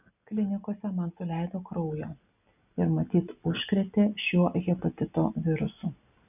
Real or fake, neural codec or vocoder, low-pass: real; none; 3.6 kHz